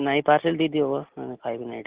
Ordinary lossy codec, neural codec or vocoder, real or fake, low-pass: Opus, 16 kbps; none; real; 3.6 kHz